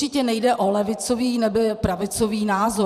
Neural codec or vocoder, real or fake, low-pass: vocoder, 44.1 kHz, 128 mel bands every 512 samples, BigVGAN v2; fake; 14.4 kHz